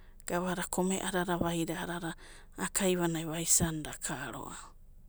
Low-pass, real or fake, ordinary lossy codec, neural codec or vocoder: none; real; none; none